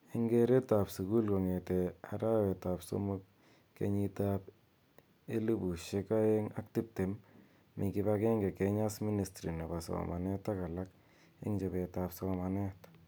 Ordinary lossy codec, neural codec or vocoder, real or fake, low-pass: none; none; real; none